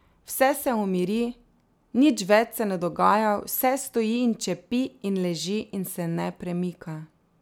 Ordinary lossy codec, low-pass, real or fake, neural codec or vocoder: none; none; real; none